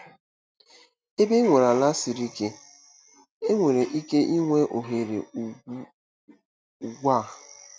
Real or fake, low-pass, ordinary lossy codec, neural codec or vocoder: real; none; none; none